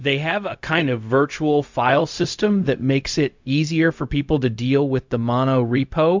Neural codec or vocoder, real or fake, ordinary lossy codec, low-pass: codec, 16 kHz, 0.4 kbps, LongCat-Audio-Codec; fake; MP3, 64 kbps; 7.2 kHz